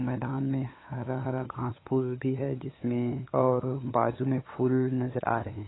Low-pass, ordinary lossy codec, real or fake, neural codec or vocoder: 7.2 kHz; AAC, 16 kbps; fake; codec, 16 kHz, 8 kbps, FunCodec, trained on LibriTTS, 25 frames a second